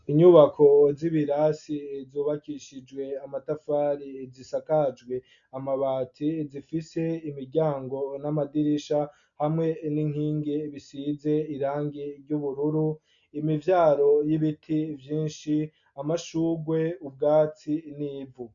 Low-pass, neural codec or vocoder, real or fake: 7.2 kHz; none; real